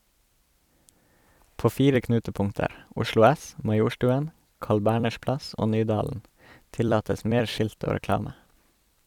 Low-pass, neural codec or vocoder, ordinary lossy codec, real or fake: 19.8 kHz; vocoder, 44.1 kHz, 128 mel bands every 256 samples, BigVGAN v2; none; fake